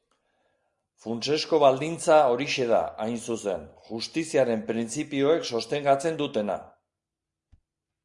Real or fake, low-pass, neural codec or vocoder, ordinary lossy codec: real; 10.8 kHz; none; Opus, 64 kbps